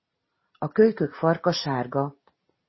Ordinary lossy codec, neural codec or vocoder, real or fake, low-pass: MP3, 24 kbps; none; real; 7.2 kHz